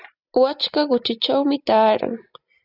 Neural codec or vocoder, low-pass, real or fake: none; 5.4 kHz; real